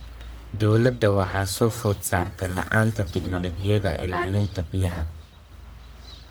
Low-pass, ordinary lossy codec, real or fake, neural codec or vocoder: none; none; fake; codec, 44.1 kHz, 1.7 kbps, Pupu-Codec